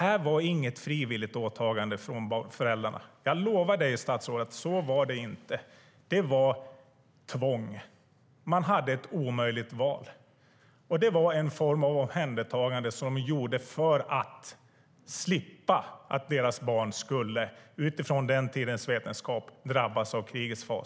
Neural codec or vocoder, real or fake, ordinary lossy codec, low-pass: none; real; none; none